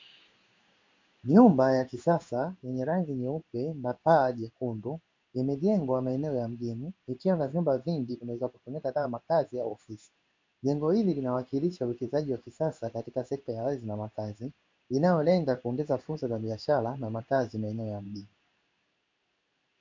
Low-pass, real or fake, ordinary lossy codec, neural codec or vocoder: 7.2 kHz; fake; MP3, 48 kbps; codec, 16 kHz in and 24 kHz out, 1 kbps, XY-Tokenizer